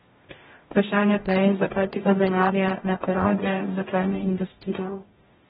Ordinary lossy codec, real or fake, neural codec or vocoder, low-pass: AAC, 16 kbps; fake; codec, 44.1 kHz, 0.9 kbps, DAC; 19.8 kHz